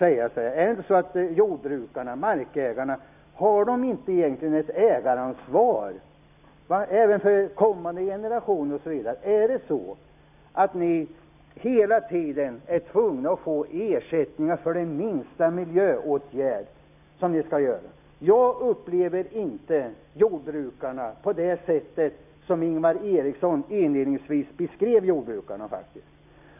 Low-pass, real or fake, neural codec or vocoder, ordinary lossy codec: 3.6 kHz; real; none; none